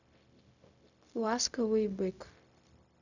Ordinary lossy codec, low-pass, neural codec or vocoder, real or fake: none; 7.2 kHz; codec, 16 kHz, 0.4 kbps, LongCat-Audio-Codec; fake